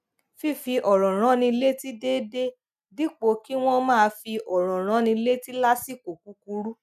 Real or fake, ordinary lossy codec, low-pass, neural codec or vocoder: real; none; 14.4 kHz; none